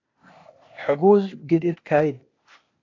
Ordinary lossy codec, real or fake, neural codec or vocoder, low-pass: AAC, 32 kbps; fake; codec, 16 kHz, 0.8 kbps, ZipCodec; 7.2 kHz